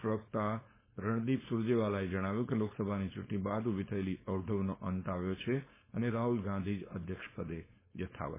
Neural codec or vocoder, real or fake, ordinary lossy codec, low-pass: codec, 16 kHz, 4.8 kbps, FACodec; fake; MP3, 16 kbps; 3.6 kHz